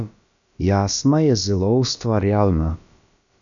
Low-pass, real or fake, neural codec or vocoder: 7.2 kHz; fake; codec, 16 kHz, about 1 kbps, DyCAST, with the encoder's durations